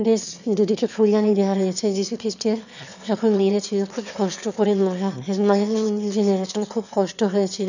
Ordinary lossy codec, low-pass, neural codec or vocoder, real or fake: none; 7.2 kHz; autoencoder, 22.05 kHz, a latent of 192 numbers a frame, VITS, trained on one speaker; fake